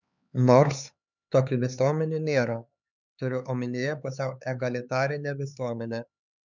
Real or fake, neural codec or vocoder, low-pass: fake; codec, 16 kHz, 4 kbps, X-Codec, HuBERT features, trained on LibriSpeech; 7.2 kHz